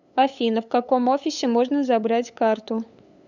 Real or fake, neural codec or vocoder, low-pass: fake; codec, 16 kHz, 8 kbps, FunCodec, trained on LibriTTS, 25 frames a second; 7.2 kHz